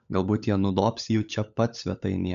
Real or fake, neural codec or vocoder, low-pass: fake; codec, 16 kHz, 16 kbps, FunCodec, trained on LibriTTS, 50 frames a second; 7.2 kHz